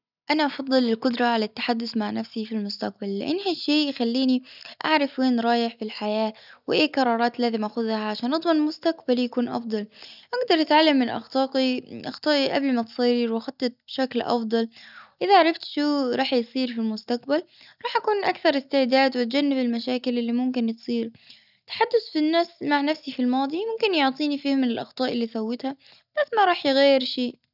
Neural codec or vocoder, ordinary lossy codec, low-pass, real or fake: none; none; 5.4 kHz; real